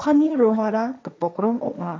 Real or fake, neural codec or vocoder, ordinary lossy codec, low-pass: fake; codec, 16 kHz, 1.1 kbps, Voila-Tokenizer; none; none